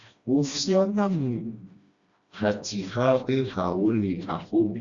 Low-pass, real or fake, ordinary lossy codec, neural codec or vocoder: 7.2 kHz; fake; Opus, 64 kbps; codec, 16 kHz, 1 kbps, FreqCodec, smaller model